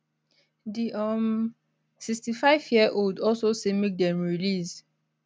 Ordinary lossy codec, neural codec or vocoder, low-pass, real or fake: none; none; none; real